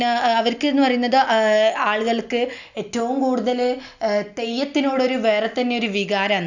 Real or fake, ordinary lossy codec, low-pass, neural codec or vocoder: real; none; 7.2 kHz; none